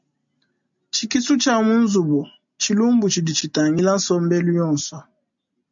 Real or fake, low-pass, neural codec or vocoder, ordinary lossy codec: real; 7.2 kHz; none; MP3, 64 kbps